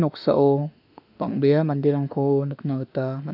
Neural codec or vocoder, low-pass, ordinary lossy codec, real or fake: autoencoder, 48 kHz, 32 numbers a frame, DAC-VAE, trained on Japanese speech; 5.4 kHz; none; fake